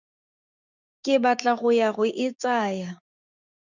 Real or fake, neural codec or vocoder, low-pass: fake; codec, 16 kHz, 6 kbps, DAC; 7.2 kHz